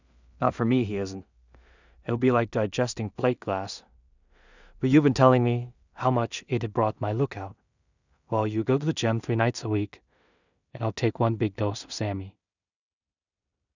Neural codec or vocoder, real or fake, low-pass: codec, 16 kHz in and 24 kHz out, 0.4 kbps, LongCat-Audio-Codec, two codebook decoder; fake; 7.2 kHz